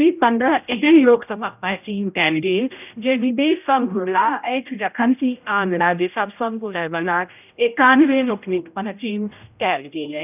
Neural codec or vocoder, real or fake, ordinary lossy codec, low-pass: codec, 16 kHz, 0.5 kbps, X-Codec, HuBERT features, trained on general audio; fake; none; 3.6 kHz